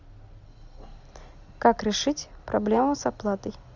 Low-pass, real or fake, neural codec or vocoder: 7.2 kHz; real; none